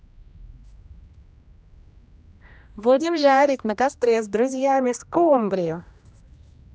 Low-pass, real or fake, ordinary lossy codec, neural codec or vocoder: none; fake; none; codec, 16 kHz, 1 kbps, X-Codec, HuBERT features, trained on general audio